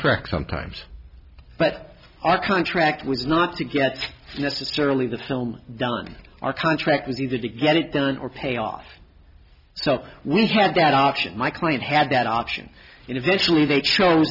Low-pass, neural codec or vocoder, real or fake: 5.4 kHz; none; real